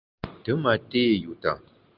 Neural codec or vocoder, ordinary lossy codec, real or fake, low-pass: none; Opus, 24 kbps; real; 5.4 kHz